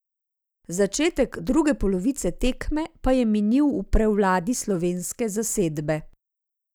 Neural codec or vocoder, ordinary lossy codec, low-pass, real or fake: none; none; none; real